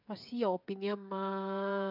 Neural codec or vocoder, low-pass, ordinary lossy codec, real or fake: codec, 16 kHz, 16 kbps, FreqCodec, smaller model; 5.4 kHz; none; fake